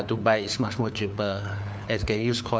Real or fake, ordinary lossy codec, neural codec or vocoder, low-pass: fake; none; codec, 16 kHz, 4 kbps, FunCodec, trained on LibriTTS, 50 frames a second; none